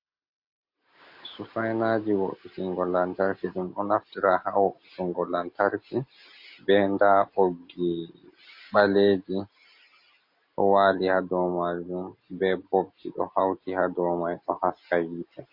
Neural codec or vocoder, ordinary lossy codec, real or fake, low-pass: none; MP3, 32 kbps; real; 5.4 kHz